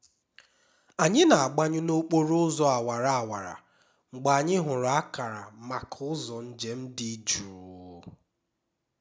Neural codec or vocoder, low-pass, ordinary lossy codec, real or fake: none; none; none; real